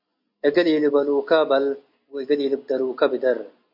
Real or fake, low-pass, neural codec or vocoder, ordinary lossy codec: real; 5.4 kHz; none; MP3, 48 kbps